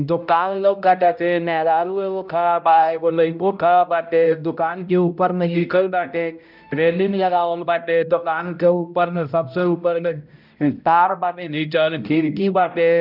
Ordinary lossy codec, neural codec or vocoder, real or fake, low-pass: none; codec, 16 kHz, 0.5 kbps, X-Codec, HuBERT features, trained on balanced general audio; fake; 5.4 kHz